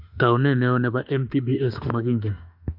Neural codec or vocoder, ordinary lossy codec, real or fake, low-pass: autoencoder, 48 kHz, 32 numbers a frame, DAC-VAE, trained on Japanese speech; AAC, 48 kbps; fake; 5.4 kHz